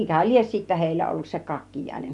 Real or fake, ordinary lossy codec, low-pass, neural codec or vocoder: real; none; 10.8 kHz; none